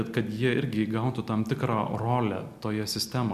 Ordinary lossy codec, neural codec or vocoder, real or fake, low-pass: Opus, 64 kbps; none; real; 14.4 kHz